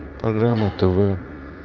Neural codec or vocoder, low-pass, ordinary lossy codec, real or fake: vocoder, 44.1 kHz, 80 mel bands, Vocos; 7.2 kHz; Opus, 32 kbps; fake